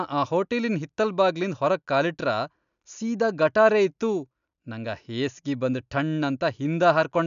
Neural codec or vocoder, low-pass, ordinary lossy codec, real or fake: none; 7.2 kHz; none; real